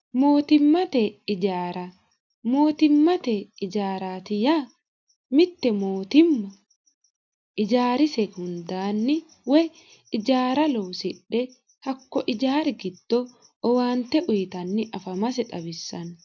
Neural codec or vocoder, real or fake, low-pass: none; real; 7.2 kHz